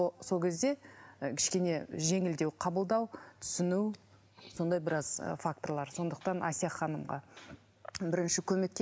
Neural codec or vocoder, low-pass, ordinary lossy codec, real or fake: none; none; none; real